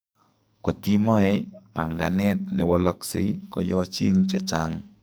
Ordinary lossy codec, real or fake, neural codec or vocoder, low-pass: none; fake; codec, 44.1 kHz, 2.6 kbps, SNAC; none